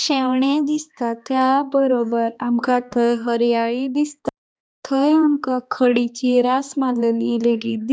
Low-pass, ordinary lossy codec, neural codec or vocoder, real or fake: none; none; codec, 16 kHz, 2 kbps, X-Codec, HuBERT features, trained on balanced general audio; fake